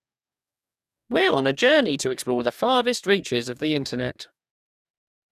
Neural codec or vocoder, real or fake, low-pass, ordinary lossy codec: codec, 44.1 kHz, 2.6 kbps, DAC; fake; 14.4 kHz; none